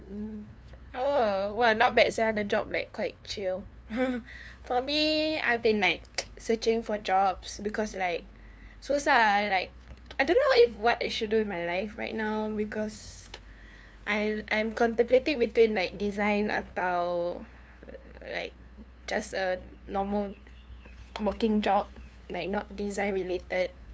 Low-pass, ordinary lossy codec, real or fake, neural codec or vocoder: none; none; fake; codec, 16 kHz, 2 kbps, FunCodec, trained on LibriTTS, 25 frames a second